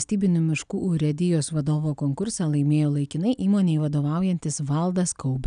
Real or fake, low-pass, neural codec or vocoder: real; 9.9 kHz; none